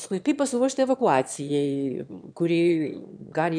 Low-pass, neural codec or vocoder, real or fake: 9.9 kHz; autoencoder, 22.05 kHz, a latent of 192 numbers a frame, VITS, trained on one speaker; fake